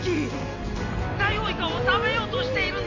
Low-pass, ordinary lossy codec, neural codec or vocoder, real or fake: 7.2 kHz; AAC, 32 kbps; none; real